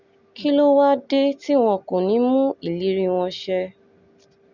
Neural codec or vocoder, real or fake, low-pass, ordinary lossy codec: none; real; 7.2 kHz; Opus, 64 kbps